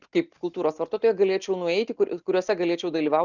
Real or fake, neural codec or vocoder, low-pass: real; none; 7.2 kHz